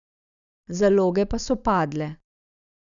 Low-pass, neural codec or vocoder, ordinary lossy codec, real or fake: 7.2 kHz; none; none; real